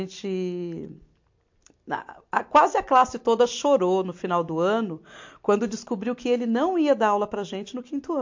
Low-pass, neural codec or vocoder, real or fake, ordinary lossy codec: 7.2 kHz; none; real; MP3, 48 kbps